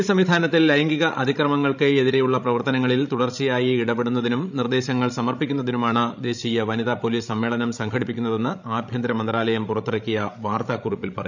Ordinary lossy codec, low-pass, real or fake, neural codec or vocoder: none; 7.2 kHz; fake; codec, 16 kHz, 16 kbps, FreqCodec, larger model